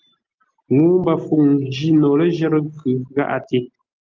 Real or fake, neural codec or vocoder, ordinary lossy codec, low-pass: real; none; Opus, 24 kbps; 7.2 kHz